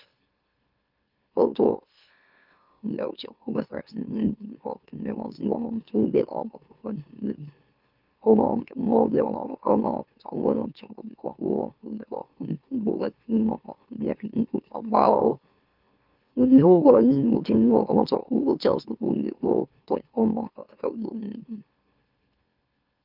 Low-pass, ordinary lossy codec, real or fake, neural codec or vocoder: 5.4 kHz; Opus, 32 kbps; fake; autoencoder, 44.1 kHz, a latent of 192 numbers a frame, MeloTTS